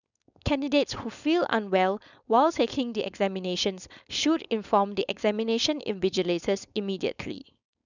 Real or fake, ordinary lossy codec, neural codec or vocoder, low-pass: fake; none; codec, 16 kHz, 4.8 kbps, FACodec; 7.2 kHz